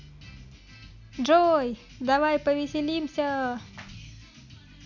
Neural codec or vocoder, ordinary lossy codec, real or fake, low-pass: none; none; real; 7.2 kHz